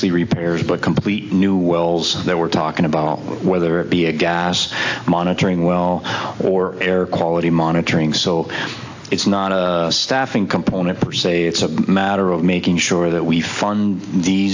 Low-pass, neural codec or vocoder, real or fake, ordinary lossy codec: 7.2 kHz; none; real; AAC, 48 kbps